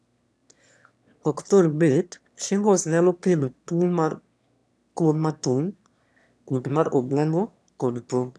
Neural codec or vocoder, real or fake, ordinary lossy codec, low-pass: autoencoder, 22.05 kHz, a latent of 192 numbers a frame, VITS, trained on one speaker; fake; none; none